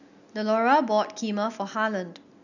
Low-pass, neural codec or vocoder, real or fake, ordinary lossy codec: 7.2 kHz; none; real; none